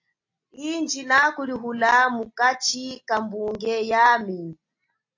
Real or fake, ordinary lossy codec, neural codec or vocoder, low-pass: real; AAC, 48 kbps; none; 7.2 kHz